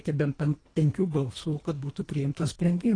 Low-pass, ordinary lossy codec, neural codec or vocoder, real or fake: 9.9 kHz; AAC, 32 kbps; codec, 24 kHz, 1.5 kbps, HILCodec; fake